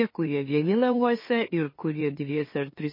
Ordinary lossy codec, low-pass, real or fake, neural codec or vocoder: MP3, 24 kbps; 5.4 kHz; fake; autoencoder, 44.1 kHz, a latent of 192 numbers a frame, MeloTTS